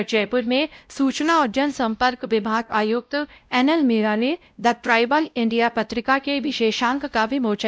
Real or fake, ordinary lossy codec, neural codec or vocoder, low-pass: fake; none; codec, 16 kHz, 0.5 kbps, X-Codec, WavLM features, trained on Multilingual LibriSpeech; none